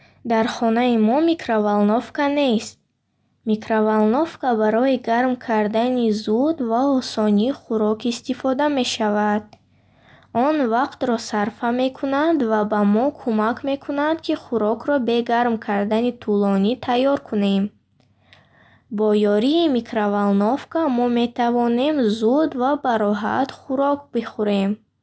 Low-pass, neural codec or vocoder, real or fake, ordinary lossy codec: none; none; real; none